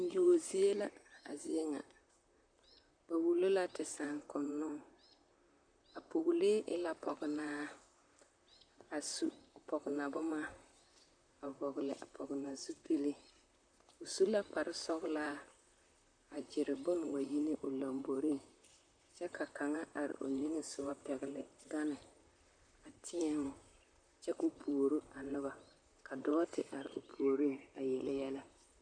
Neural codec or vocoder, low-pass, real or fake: vocoder, 44.1 kHz, 128 mel bands, Pupu-Vocoder; 9.9 kHz; fake